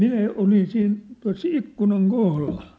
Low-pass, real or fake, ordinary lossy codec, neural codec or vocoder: none; real; none; none